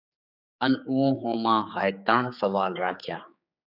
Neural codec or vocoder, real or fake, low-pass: codec, 16 kHz, 4 kbps, X-Codec, HuBERT features, trained on general audio; fake; 5.4 kHz